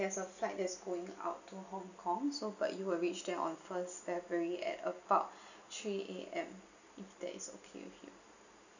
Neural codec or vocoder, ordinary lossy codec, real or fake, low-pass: none; none; real; 7.2 kHz